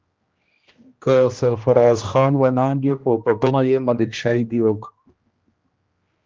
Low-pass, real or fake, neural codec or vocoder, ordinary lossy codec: 7.2 kHz; fake; codec, 16 kHz, 1 kbps, X-Codec, HuBERT features, trained on general audio; Opus, 32 kbps